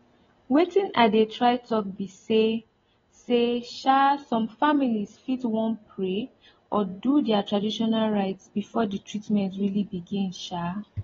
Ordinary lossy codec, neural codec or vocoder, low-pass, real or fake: AAC, 24 kbps; none; 7.2 kHz; real